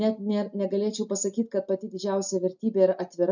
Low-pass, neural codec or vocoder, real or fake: 7.2 kHz; none; real